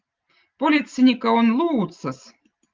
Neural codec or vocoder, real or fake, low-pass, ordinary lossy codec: none; real; 7.2 kHz; Opus, 24 kbps